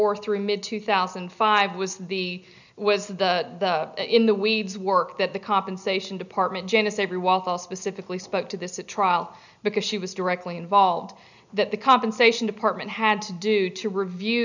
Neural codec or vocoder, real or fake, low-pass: none; real; 7.2 kHz